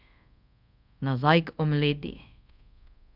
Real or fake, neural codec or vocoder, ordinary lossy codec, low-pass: fake; codec, 24 kHz, 0.5 kbps, DualCodec; none; 5.4 kHz